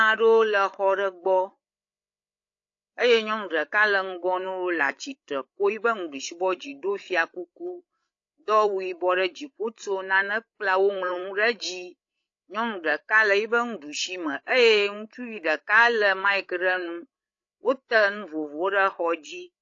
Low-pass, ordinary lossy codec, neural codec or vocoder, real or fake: 7.2 kHz; AAC, 48 kbps; codec, 16 kHz, 8 kbps, FreqCodec, larger model; fake